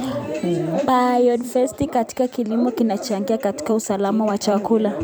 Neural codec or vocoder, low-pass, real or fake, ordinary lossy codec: vocoder, 44.1 kHz, 128 mel bands every 256 samples, BigVGAN v2; none; fake; none